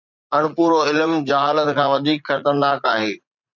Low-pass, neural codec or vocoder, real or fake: 7.2 kHz; vocoder, 44.1 kHz, 80 mel bands, Vocos; fake